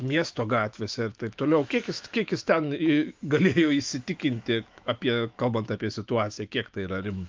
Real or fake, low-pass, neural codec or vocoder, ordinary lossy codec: fake; 7.2 kHz; autoencoder, 48 kHz, 128 numbers a frame, DAC-VAE, trained on Japanese speech; Opus, 24 kbps